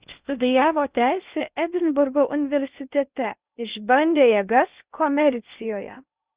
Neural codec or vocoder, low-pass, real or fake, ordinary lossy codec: codec, 16 kHz in and 24 kHz out, 0.6 kbps, FocalCodec, streaming, 2048 codes; 3.6 kHz; fake; Opus, 64 kbps